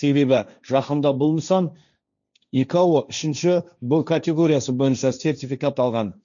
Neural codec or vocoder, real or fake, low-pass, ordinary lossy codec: codec, 16 kHz, 1.1 kbps, Voila-Tokenizer; fake; 7.2 kHz; none